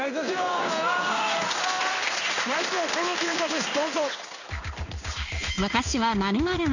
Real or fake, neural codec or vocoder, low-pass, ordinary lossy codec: fake; codec, 16 kHz in and 24 kHz out, 1 kbps, XY-Tokenizer; 7.2 kHz; none